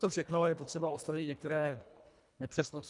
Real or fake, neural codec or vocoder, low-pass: fake; codec, 24 kHz, 1.5 kbps, HILCodec; 10.8 kHz